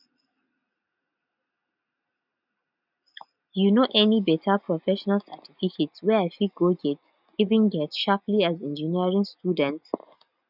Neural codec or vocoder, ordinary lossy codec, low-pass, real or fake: none; none; 5.4 kHz; real